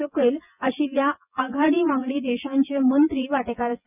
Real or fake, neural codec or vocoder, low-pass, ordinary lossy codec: fake; vocoder, 24 kHz, 100 mel bands, Vocos; 3.6 kHz; none